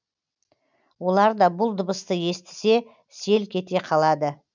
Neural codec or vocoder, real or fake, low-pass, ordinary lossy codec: none; real; 7.2 kHz; none